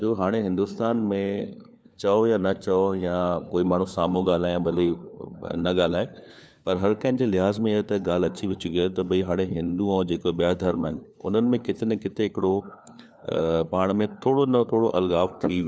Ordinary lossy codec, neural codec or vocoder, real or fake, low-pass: none; codec, 16 kHz, 4 kbps, FunCodec, trained on LibriTTS, 50 frames a second; fake; none